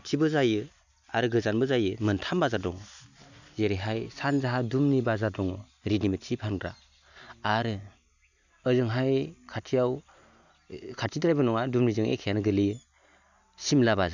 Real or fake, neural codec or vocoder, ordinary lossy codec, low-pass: real; none; none; 7.2 kHz